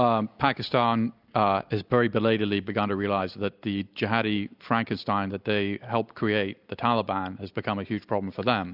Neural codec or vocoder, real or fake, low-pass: none; real; 5.4 kHz